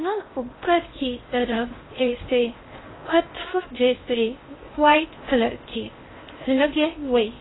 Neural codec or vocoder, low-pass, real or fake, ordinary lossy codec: codec, 16 kHz in and 24 kHz out, 0.6 kbps, FocalCodec, streaming, 4096 codes; 7.2 kHz; fake; AAC, 16 kbps